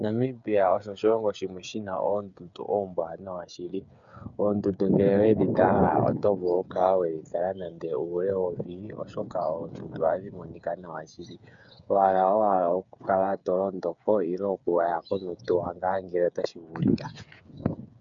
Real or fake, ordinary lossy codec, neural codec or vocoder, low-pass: fake; MP3, 96 kbps; codec, 16 kHz, 8 kbps, FreqCodec, smaller model; 7.2 kHz